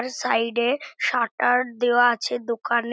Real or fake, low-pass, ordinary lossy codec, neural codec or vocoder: real; none; none; none